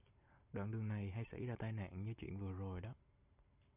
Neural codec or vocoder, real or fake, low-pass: none; real; 3.6 kHz